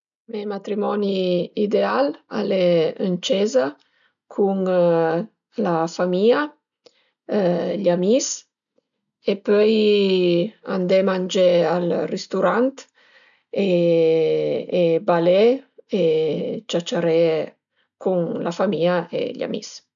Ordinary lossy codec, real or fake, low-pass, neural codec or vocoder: none; real; 7.2 kHz; none